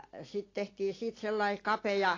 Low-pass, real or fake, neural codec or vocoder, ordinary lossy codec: 7.2 kHz; real; none; AAC, 32 kbps